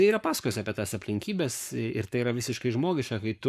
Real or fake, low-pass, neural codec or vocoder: fake; 14.4 kHz; codec, 44.1 kHz, 7.8 kbps, Pupu-Codec